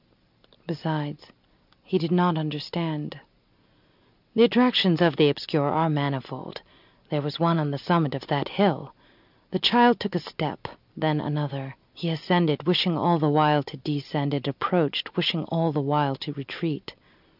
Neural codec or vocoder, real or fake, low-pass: none; real; 5.4 kHz